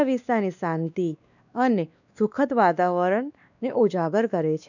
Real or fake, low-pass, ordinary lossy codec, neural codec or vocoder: fake; 7.2 kHz; none; codec, 16 kHz, 2 kbps, X-Codec, WavLM features, trained on Multilingual LibriSpeech